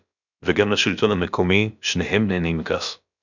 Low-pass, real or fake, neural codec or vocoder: 7.2 kHz; fake; codec, 16 kHz, about 1 kbps, DyCAST, with the encoder's durations